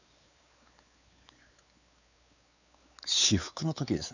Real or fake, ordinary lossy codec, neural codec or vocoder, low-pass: fake; none; codec, 16 kHz, 4 kbps, X-Codec, WavLM features, trained on Multilingual LibriSpeech; 7.2 kHz